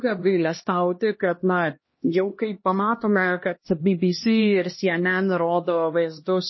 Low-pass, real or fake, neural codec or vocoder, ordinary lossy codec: 7.2 kHz; fake; codec, 16 kHz, 1 kbps, X-Codec, HuBERT features, trained on LibriSpeech; MP3, 24 kbps